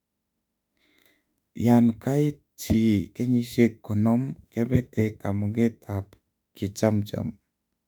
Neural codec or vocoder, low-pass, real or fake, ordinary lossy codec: autoencoder, 48 kHz, 32 numbers a frame, DAC-VAE, trained on Japanese speech; 19.8 kHz; fake; none